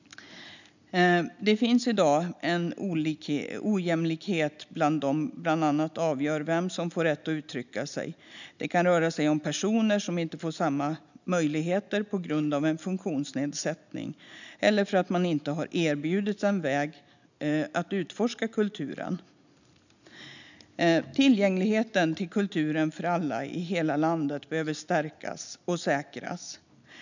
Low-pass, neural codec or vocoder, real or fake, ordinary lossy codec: 7.2 kHz; none; real; none